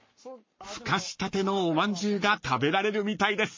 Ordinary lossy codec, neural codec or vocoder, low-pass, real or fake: MP3, 32 kbps; codec, 44.1 kHz, 7.8 kbps, Pupu-Codec; 7.2 kHz; fake